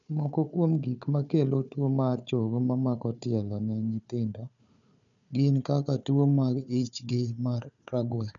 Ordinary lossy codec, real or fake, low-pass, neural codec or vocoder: none; fake; 7.2 kHz; codec, 16 kHz, 4 kbps, FunCodec, trained on Chinese and English, 50 frames a second